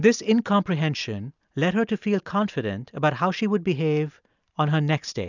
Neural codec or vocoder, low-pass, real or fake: none; 7.2 kHz; real